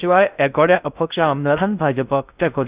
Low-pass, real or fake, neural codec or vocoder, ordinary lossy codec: 3.6 kHz; fake; codec, 16 kHz in and 24 kHz out, 0.6 kbps, FocalCodec, streaming, 2048 codes; Opus, 64 kbps